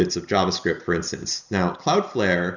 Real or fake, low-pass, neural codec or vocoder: real; 7.2 kHz; none